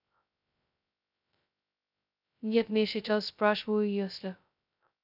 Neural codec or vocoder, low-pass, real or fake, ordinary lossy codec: codec, 16 kHz, 0.2 kbps, FocalCodec; 5.4 kHz; fake; none